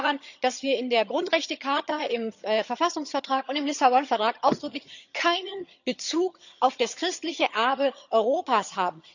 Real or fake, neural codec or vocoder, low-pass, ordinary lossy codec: fake; vocoder, 22.05 kHz, 80 mel bands, HiFi-GAN; 7.2 kHz; none